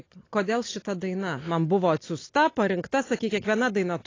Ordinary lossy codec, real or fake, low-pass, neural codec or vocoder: AAC, 32 kbps; real; 7.2 kHz; none